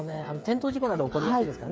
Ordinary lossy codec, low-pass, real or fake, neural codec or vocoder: none; none; fake; codec, 16 kHz, 8 kbps, FreqCodec, smaller model